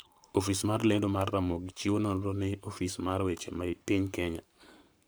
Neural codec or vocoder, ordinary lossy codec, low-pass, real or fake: codec, 44.1 kHz, 7.8 kbps, Pupu-Codec; none; none; fake